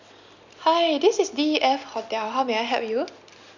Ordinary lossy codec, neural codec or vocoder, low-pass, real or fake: none; none; 7.2 kHz; real